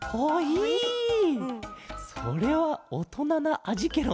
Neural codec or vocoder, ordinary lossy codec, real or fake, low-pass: none; none; real; none